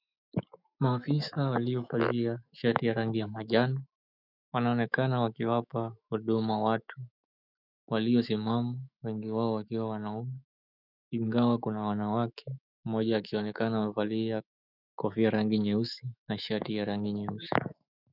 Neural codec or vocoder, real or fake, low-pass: autoencoder, 48 kHz, 128 numbers a frame, DAC-VAE, trained on Japanese speech; fake; 5.4 kHz